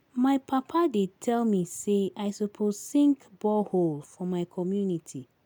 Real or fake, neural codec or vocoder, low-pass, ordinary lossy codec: real; none; none; none